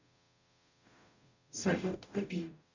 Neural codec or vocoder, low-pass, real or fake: codec, 44.1 kHz, 0.9 kbps, DAC; 7.2 kHz; fake